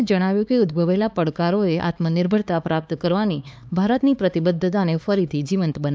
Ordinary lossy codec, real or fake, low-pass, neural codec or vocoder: none; fake; none; codec, 16 kHz, 4 kbps, X-Codec, HuBERT features, trained on LibriSpeech